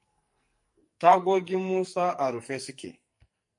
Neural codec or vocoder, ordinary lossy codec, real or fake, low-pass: codec, 44.1 kHz, 2.6 kbps, SNAC; MP3, 48 kbps; fake; 10.8 kHz